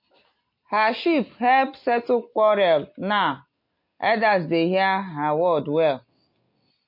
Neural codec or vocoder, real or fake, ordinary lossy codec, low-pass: none; real; MP3, 32 kbps; 5.4 kHz